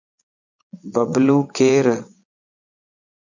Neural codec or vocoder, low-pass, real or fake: vocoder, 22.05 kHz, 80 mel bands, Vocos; 7.2 kHz; fake